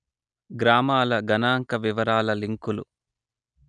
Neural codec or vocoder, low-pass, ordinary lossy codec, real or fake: none; 9.9 kHz; none; real